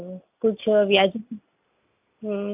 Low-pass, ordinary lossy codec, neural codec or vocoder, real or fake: 3.6 kHz; AAC, 32 kbps; none; real